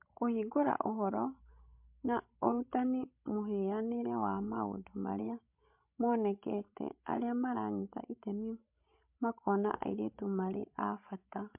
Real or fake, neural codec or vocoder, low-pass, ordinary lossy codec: fake; codec, 16 kHz, 16 kbps, FreqCodec, larger model; 3.6 kHz; MP3, 32 kbps